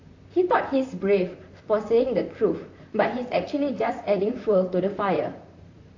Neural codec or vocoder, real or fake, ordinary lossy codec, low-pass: vocoder, 44.1 kHz, 128 mel bands, Pupu-Vocoder; fake; AAC, 48 kbps; 7.2 kHz